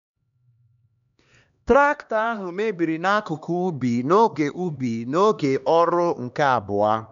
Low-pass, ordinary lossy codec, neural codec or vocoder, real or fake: 7.2 kHz; none; codec, 16 kHz, 2 kbps, X-Codec, HuBERT features, trained on LibriSpeech; fake